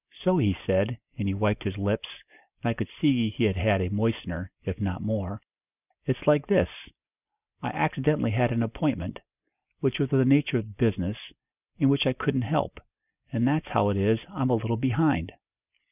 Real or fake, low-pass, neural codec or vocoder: real; 3.6 kHz; none